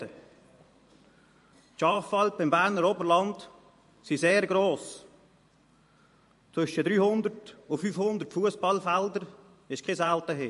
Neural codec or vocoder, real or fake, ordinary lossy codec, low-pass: vocoder, 44.1 kHz, 128 mel bands every 512 samples, BigVGAN v2; fake; MP3, 48 kbps; 14.4 kHz